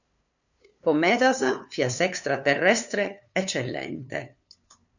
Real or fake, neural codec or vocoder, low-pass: fake; codec, 16 kHz, 2 kbps, FunCodec, trained on LibriTTS, 25 frames a second; 7.2 kHz